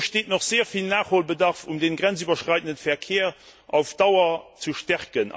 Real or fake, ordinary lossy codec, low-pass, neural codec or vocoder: real; none; none; none